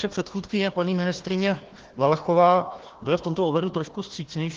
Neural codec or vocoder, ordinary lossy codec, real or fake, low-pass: codec, 16 kHz, 1 kbps, FunCodec, trained on Chinese and English, 50 frames a second; Opus, 16 kbps; fake; 7.2 kHz